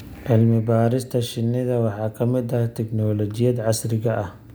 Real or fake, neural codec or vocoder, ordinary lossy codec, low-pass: real; none; none; none